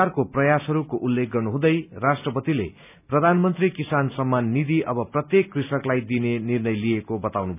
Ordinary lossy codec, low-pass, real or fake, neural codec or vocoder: none; 3.6 kHz; real; none